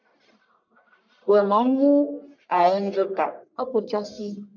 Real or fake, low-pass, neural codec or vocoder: fake; 7.2 kHz; codec, 44.1 kHz, 1.7 kbps, Pupu-Codec